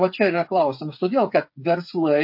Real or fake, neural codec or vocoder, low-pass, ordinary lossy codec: fake; codec, 16 kHz, 8 kbps, FreqCodec, smaller model; 5.4 kHz; MP3, 32 kbps